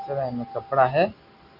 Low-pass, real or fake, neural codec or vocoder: 5.4 kHz; real; none